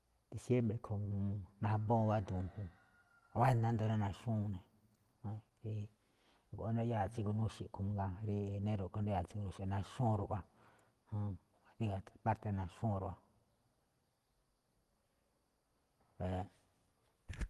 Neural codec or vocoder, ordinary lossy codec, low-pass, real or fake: none; Opus, 24 kbps; 14.4 kHz; real